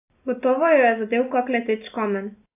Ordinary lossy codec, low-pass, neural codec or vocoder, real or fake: AAC, 32 kbps; 3.6 kHz; none; real